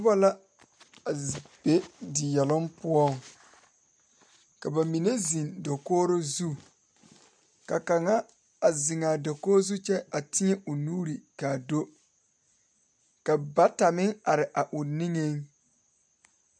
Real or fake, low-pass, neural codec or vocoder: real; 9.9 kHz; none